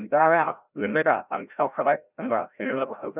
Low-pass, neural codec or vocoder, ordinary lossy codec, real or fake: 3.6 kHz; codec, 16 kHz, 0.5 kbps, FreqCodec, larger model; none; fake